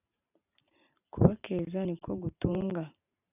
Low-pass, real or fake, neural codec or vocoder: 3.6 kHz; fake; vocoder, 44.1 kHz, 128 mel bands every 512 samples, BigVGAN v2